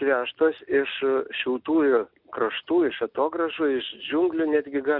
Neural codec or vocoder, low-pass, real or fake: none; 5.4 kHz; real